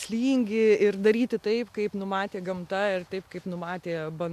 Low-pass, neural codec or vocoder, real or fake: 14.4 kHz; none; real